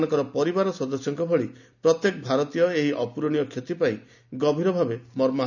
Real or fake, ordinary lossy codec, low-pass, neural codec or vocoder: real; none; 7.2 kHz; none